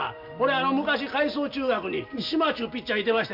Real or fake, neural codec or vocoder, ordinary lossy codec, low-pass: real; none; MP3, 48 kbps; 5.4 kHz